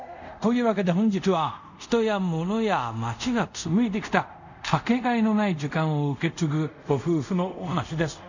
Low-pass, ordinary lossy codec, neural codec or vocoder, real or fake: 7.2 kHz; none; codec, 24 kHz, 0.5 kbps, DualCodec; fake